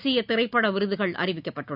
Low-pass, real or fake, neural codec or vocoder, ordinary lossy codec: 5.4 kHz; real; none; none